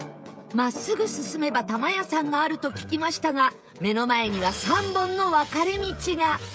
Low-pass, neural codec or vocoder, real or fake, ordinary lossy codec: none; codec, 16 kHz, 16 kbps, FreqCodec, smaller model; fake; none